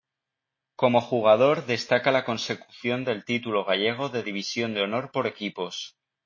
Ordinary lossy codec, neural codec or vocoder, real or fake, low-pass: MP3, 32 kbps; none; real; 7.2 kHz